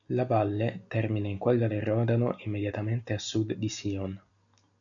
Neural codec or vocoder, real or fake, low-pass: none; real; 7.2 kHz